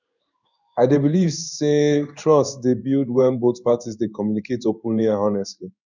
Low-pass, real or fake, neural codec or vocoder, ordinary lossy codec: 7.2 kHz; fake; codec, 16 kHz in and 24 kHz out, 1 kbps, XY-Tokenizer; none